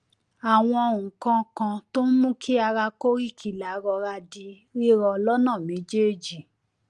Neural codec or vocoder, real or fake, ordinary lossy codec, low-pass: none; real; Opus, 32 kbps; 10.8 kHz